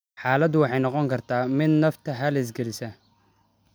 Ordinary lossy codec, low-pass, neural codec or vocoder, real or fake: none; none; none; real